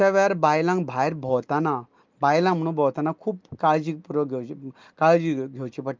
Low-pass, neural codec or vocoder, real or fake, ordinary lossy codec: 7.2 kHz; none; real; Opus, 24 kbps